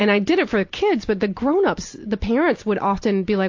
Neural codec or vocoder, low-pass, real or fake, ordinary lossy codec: none; 7.2 kHz; real; AAC, 48 kbps